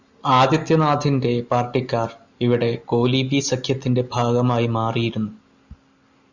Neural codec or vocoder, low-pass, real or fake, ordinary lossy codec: none; 7.2 kHz; real; Opus, 64 kbps